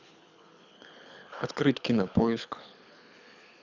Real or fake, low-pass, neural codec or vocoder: fake; 7.2 kHz; codec, 24 kHz, 6 kbps, HILCodec